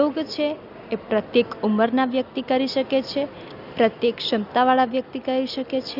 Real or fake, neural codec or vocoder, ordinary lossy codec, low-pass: real; none; none; 5.4 kHz